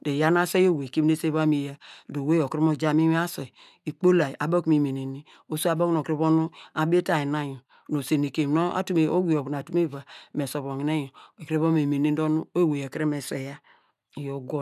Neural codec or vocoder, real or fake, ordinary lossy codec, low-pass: none; real; none; 14.4 kHz